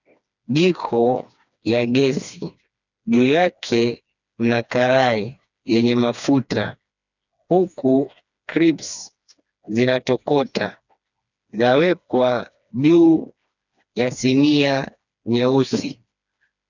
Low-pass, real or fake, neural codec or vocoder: 7.2 kHz; fake; codec, 16 kHz, 2 kbps, FreqCodec, smaller model